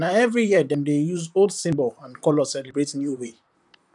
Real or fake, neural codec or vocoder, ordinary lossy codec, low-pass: fake; vocoder, 44.1 kHz, 128 mel bands, Pupu-Vocoder; none; 10.8 kHz